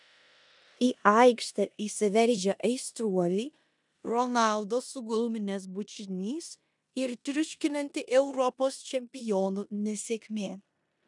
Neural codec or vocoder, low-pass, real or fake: codec, 16 kHz in and 24 kHz out, 0.9 kbps, LongCat-Audio-Codec, four codebook decoder; 10.8 kHz; fake